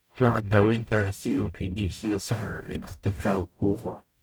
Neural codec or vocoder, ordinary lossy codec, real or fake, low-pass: codec, 44.1 kHz, 0.9 kbps, DAC; none; fake; none